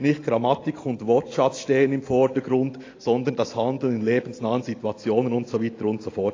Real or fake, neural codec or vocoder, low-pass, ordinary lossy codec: real; none; 7.2 kHz; AAC, 32 kbps